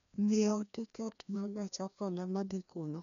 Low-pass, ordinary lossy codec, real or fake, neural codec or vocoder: 7.2 kHz; none; fake; codec, 16 kHz, 1 kbps, FreqCodec, larger model